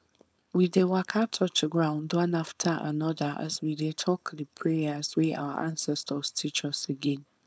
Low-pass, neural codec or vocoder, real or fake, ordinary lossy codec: none; codec, 16 kHz, 4.8 kbps, FACodec; fake; none